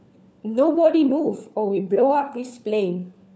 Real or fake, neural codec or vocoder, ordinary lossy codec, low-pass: fake; codec, 16 kHz, 4 kbps, FunCodec, trained on LibriTTS, 50 frames a second; none; none